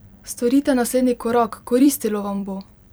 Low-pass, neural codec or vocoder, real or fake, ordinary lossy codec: none; vocoder, 44.1 kHz, 128 mel bands every 256 samples, BigVGAN v2; fake; none